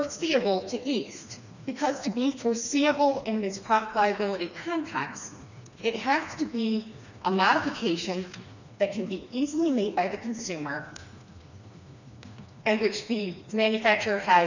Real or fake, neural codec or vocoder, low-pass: fake; codec, 16 kHz, 2 kbps, FreqCodec, smaller model; 7.2 kHz